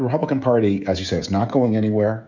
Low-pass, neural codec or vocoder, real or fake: 7.2 kHz; codec, 16 kHz, 16 kbps, FreqCodec, smaller model; fake